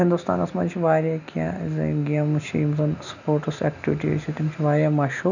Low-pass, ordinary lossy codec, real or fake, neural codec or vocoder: 7.2 kHz; none; real; none